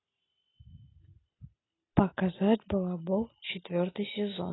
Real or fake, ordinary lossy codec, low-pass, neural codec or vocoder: real; AAC, 16 kbps; 7.2 kHz; none